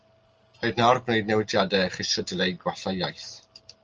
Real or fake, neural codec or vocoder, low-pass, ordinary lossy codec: real; none; 7.2 kHz; Opus, 24 kbps